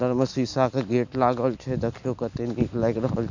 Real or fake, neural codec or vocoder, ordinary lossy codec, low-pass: real; none; none; 7.2 kHz